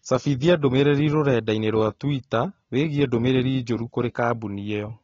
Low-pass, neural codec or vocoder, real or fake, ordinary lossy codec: 7.2 kHz; none; real; AAC, 24 kbps